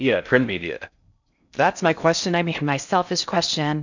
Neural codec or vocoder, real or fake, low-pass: codec, 16 kHz in and 24 kHz out, 0.6 kbps, FocalCodec, streaming, 4096 codes; fake; 7.2 kHz